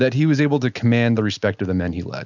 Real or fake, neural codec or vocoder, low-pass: real; none; 7.2 kHz